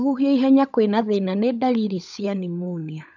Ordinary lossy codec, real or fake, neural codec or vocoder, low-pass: none; fake; codec, 16 kHz, 16 kbps, FunCodec, trained on LibriTTS, 50 frames a second; 7.2 kHz